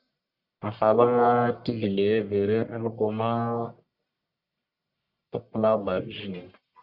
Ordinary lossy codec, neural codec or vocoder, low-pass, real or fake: Opus, 64 kbps; codec, 44.1 kHz, 1.7 kbps, Pupu-Codec; 5.4 kHz; fake